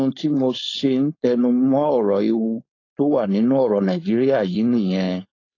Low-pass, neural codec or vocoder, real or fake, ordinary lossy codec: 7.2 kHz; codec, 16 kHz, 4.8 kbps, FACodec; fake; AAC, 32 kbps